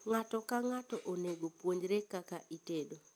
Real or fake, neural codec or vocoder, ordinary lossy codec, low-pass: real; none; none; none